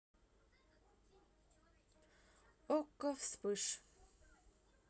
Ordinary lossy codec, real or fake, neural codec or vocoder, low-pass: none; real; none; none